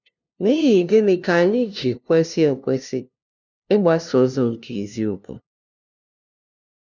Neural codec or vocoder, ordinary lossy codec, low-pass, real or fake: codec, 16 kHz, 0.5 kbps, FunCodec, trained on LibriTTS, 25 frames a second; none; 7.2 kHz; fake